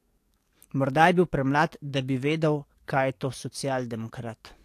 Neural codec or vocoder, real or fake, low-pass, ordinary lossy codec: codec, 44.1 kHz, 7.8 kbps, DAC; fake; 14.4 kHz; AAC, 64 kbps